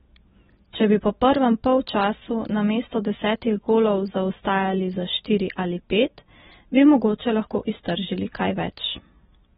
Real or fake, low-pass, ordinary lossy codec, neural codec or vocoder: real; 19.8 kHz; AAC, 16 kbps; none